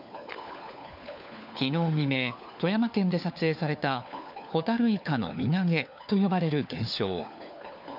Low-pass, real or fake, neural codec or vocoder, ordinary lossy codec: 5.4 kHz; fake; codec, 16 kHz, 4 kbps, FunCodec, trained on LibriTTS, 50 frames a second; none